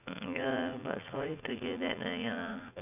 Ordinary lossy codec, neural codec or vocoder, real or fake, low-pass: none; vocoder, 44.1 kHz, 80 mel bands, Vocos; fake; 3.6 kHz